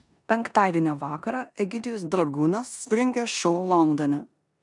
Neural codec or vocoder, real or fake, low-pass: codec, 16 kHz in and 24 kHz out, 0.9 kbps, LongCat-Audio-Codec, four codebook decoder; fake; 10.8 kHz